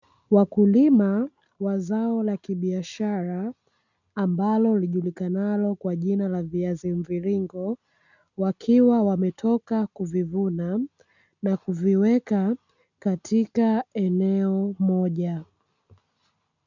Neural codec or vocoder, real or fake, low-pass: none; real; 7.2 kHz